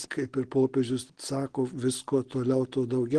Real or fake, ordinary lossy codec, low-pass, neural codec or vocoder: real; Opus, 16 kbps; 9.9 kHz; none